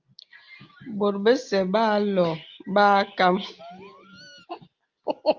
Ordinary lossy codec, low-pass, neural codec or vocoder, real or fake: Opus, 24 kbps; 7.2 kHz; none; real